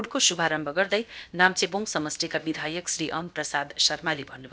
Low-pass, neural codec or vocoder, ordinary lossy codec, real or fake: none; codec, 16 kHz, about 1 kbps, DyCAST, with the encoder's durations; none; fake